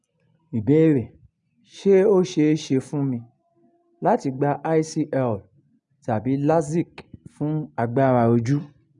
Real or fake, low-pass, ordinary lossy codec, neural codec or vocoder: real; 10.8 kHz; none; none